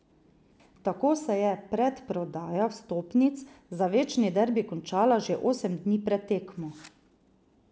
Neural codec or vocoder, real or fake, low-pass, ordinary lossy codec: none; real; none; none